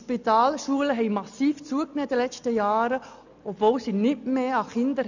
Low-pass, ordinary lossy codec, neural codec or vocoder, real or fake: 7.2 kHz; none; none; real